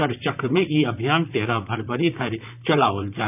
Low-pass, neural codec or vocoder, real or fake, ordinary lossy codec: 3.6 kHz; vocoder, 44.1 kHz, 128 mel bands, Pupu-Vocoder; fake; none